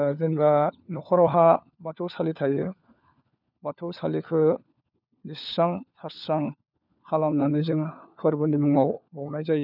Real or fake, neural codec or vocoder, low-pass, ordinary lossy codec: fake; codec, 16 kHz, 4 kbps, FunCodec, trained on LibriTTS, 50 frames a second; 5.4 kHz; none